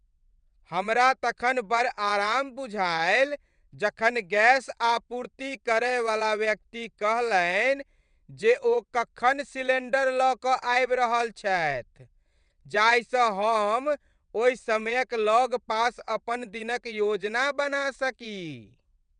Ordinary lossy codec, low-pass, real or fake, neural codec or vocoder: none; 9.9 kHz; fake; vocoder, 22.05 kHz, 80 mel bands, WaveNeXt